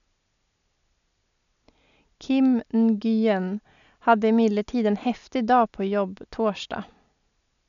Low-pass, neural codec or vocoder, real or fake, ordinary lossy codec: 7.2 kHz; none; real; none